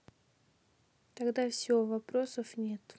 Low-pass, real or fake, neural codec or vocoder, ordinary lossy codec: none; real; none; none